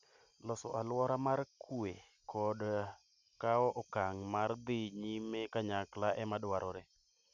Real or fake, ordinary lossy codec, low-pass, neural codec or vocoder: real; none; 7.2 kHz; none